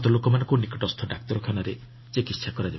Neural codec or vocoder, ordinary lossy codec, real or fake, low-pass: none; MP3, 24 kbps; real; 7.2 kHz